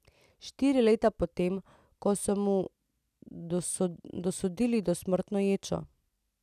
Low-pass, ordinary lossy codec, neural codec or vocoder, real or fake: 14.4 kHz; none; none; real